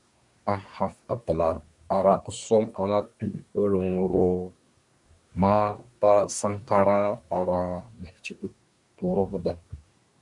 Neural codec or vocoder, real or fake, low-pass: codec, 24 kHz, 1 kbps, SNAC; fake; 10.8 kHz